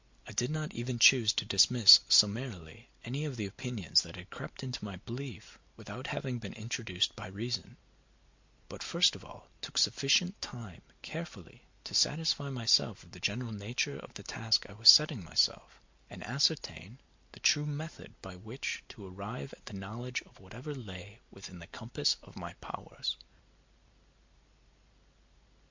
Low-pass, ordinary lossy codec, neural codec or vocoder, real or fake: 7.2 kHz; MP3, 64 kbps; none; real